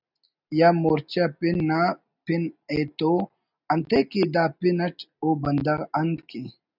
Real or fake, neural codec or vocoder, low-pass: real; none; 5.4 kHz